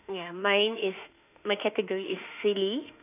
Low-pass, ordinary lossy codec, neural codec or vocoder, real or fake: 3.6 kHz; none; autoencoder, 48 kHz, 32 numbers a frame, DAC-VAE, trained on Japanese speech; fake